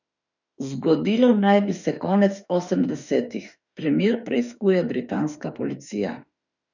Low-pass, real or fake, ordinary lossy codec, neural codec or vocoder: 7.2 kHz; fake; none; autoencoder, 48 kHz, 32 numbers a frame, DAC-VAE, trained on Japanese speech